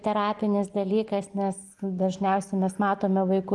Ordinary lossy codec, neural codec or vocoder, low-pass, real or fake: Opus, 24 kbps; none; 10.8 kHz; real